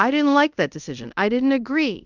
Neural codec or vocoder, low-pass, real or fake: codec, 24 kHz, 0.5 kbps, DualCodec; 7.2 kHz; fake